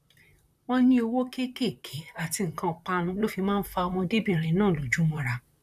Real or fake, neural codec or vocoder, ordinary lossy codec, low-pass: fake; vocoder, 44.1 kHz, 128 mel bands, Pupu-Vocoder; none; 14.4 kHz